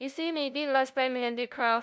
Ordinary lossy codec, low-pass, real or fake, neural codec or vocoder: none; none; fake; codec, 16 kHz, 0.5 kbps, FunCodec, trained on LibriTTS, 25 frames a second